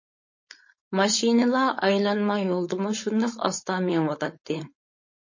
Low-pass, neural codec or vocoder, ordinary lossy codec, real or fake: 7.2 kHz; codec, 16 kHz, 4.8 kbps, FACodec; MP3, 32 kbps; fake